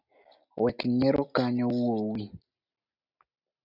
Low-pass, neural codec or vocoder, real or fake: 5.4 kHz; none; real